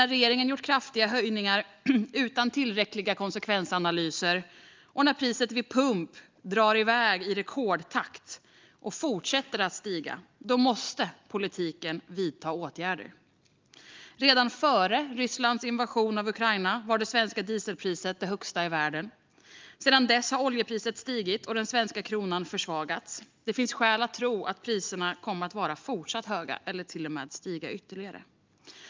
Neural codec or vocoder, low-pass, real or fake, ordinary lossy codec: none; 7.2 kHz; real; Opus, 24 kbps